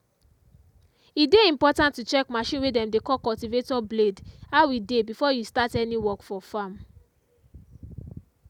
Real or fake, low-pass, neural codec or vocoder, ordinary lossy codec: real; 19.8 kHz; none; none